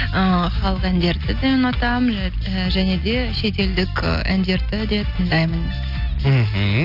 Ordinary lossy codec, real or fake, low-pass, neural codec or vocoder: none; real; 5.4 kHz; none